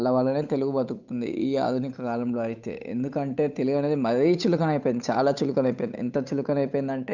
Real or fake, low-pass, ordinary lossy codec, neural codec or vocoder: fake; 7.2 kHz; none; codec, 16 kHz, 8 kbps, FunCodec, trained on Chinese and English, 25 frames a second